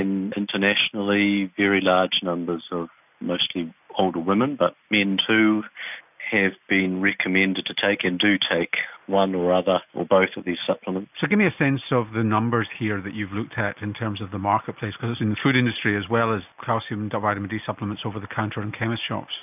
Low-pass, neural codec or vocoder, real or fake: 3.6 kHz; none; real